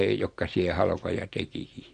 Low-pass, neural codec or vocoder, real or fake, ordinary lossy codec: 9.9 kHz; none; real; AAC, 48 kbps